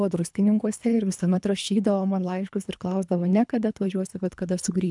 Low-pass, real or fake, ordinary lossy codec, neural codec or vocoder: 10.8 kHz; fake; MP3, 96 kbps; codec, 24 kHz, 3 kbps, HILCodec